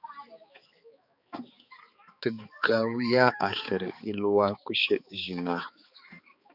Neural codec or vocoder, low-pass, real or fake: codec, 16 kHz, 4 kbps, X-Codec, HuBERT features, trained on balanced general audio; 5.4 kHz; fake